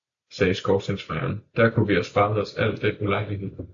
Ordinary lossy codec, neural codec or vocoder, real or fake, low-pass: AAC, 48 kbps; none; real; 7.2 kHz